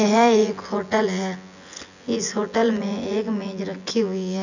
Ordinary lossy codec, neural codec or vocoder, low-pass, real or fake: none; vocoder, 24 kHz, 100 mel bands, Vocos; 7.2 kHz; fake